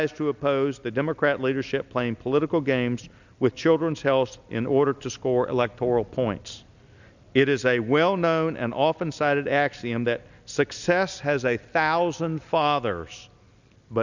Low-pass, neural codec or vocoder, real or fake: 7.2 kHz; none; real